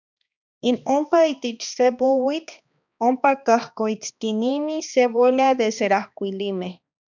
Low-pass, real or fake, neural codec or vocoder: 7.2 kHz; fake; codec, 16 kHz, 2 kbps, X-Codec, HuBERT features, trained on balanced general audio